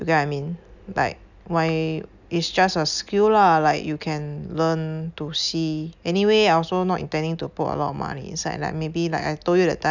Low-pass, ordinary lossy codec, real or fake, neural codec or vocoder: 7.2 kHz; none; real; none